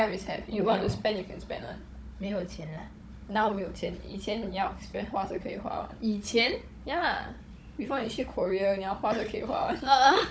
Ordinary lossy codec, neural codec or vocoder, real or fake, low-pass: none; codec, 16 kHz, 16 kbps, FunCodec, trained on Chinese and English, 50 frames a second; fake; none